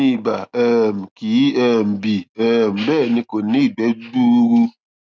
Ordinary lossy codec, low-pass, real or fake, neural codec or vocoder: none; none; real; none